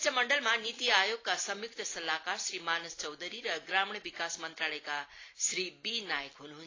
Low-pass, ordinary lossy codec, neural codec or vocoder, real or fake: 7.2 kHz; AAC, 32 kbps; none; real